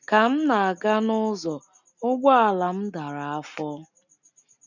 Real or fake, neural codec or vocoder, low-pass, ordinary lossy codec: real; none; 7.2 kHz; none